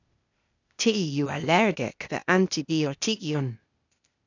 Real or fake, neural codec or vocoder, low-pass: fake; codec, 16 kHz, 0.8 kbps, ZipCodec; 7.2 kHz